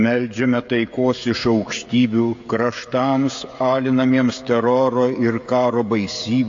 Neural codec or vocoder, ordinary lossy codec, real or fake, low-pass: codec, 16 kHz, 16 kbps, FreqCodec, smaller model; AAC, 48 kbps; fake; 7.2 kHz